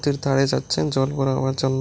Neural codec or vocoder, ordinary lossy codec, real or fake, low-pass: none; none; real; none